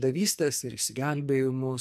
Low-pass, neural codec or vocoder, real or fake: 14.4 kHz; codec, 44.1 kHz, 2.6 kbps, SNAC; fake